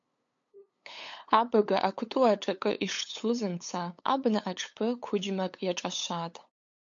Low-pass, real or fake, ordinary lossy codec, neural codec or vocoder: 7.2 kHz; fake; MP3, 48 kbps; codec, 16 kHz, 8 kbps, FunCodec, trained on LibriTTS, 25 frames a second